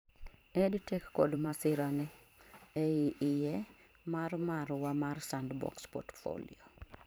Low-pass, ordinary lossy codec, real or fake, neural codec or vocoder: none; none; fake; vocoder, 44.1 kHz, 128 mel bands every 512 samples, BigVGAN v2